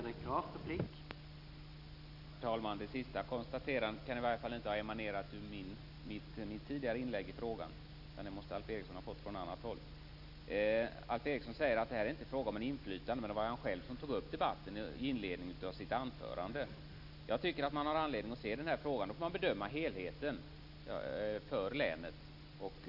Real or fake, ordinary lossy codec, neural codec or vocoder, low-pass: real; none; none; 5.4 kHz